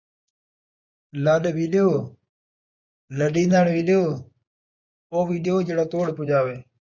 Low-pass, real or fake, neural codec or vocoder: 7.2 kHz; fake; vocoder, 24 kHz, 100 mel bands, Vocos